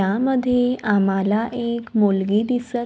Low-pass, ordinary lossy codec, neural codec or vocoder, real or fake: none; none; none; real